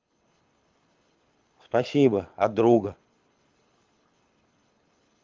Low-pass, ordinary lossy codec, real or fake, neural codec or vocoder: 7.2 kHz; Opus, 32 kbps; fake; codec, 24 kHz, 6 kbps, HILCodec